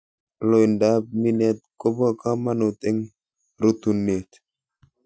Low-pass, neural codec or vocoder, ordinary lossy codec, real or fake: none; none; none; real